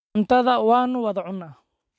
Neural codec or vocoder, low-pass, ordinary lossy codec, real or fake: none; none; none; real